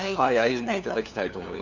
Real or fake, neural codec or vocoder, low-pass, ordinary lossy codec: fake; codec, 16 kHz, 2 kbps, FunCodec, trained on LibriTTS, 25 frames a second; 7.2 kHz; none